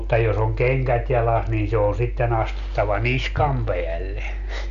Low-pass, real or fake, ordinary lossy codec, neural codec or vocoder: 7.2 kHz; real; none; none